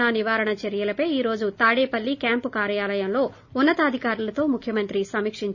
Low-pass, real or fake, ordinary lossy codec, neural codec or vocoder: 7.2 kHz; real; none; none